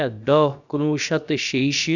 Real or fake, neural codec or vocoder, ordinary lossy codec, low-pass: fake; codec, 16 kHz, about 1 kbps, DyCAST, with the encoder's durations; none; 7.2 kHz